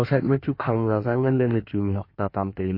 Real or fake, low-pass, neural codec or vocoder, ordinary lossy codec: fake; 5.4 kHz; codec, 16 kHz in and 24 kHz out, 1.1 kbps, FireRedTTS-2 codec; MP3, 32 kbps